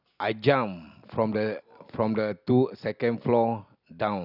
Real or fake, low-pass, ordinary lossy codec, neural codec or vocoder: real; 5.4 kHz; none; none